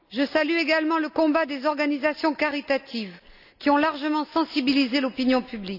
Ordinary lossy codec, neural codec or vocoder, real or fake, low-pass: none; none; real; 5.4 kHz